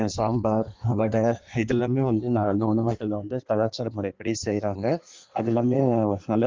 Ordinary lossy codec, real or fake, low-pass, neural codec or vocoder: Opus, 32 kbps; fake; 7.2 kHz; codec, 16 kHz in and 24 kHz out, 1.1 kbps, FireRedTTS-2 codec